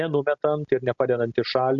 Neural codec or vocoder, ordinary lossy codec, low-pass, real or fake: none; Opus, 64 kbps; 7.2 kHz; real